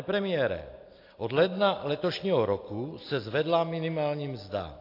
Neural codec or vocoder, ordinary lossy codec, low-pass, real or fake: none; AAC, 32 kbps; 5.4 kHz; real